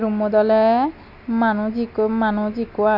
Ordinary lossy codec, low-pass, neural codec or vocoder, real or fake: none; 5.4 kHz; none; real